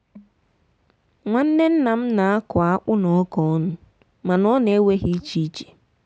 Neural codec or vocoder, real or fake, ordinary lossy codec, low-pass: none; real; none; none